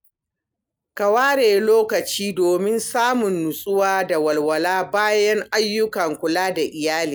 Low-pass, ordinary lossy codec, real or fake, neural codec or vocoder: none; none; real; none